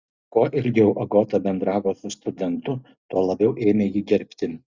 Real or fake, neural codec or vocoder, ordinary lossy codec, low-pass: real; none; Opus, 64 kbps; 7.2 kHz